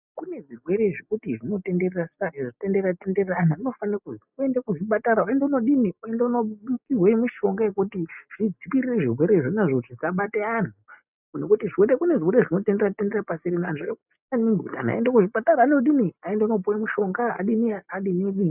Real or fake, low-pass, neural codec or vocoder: real; 3.6 kHz; none